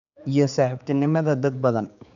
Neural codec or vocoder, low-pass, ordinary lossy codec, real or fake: codec, 16 kHz, 4 kbps, X-Codec, HuBERT features, trained on general audio; 7.2 kHz; none; fake